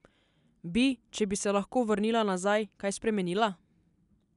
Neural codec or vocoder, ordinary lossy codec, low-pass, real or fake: none; none; 10.8 kHz; real